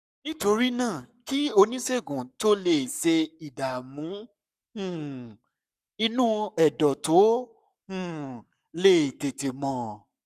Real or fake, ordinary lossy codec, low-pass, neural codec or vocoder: fake; none; 14.4 kHz; codec, 44.1 kHz, 7.8 kbps, Pupu-Codec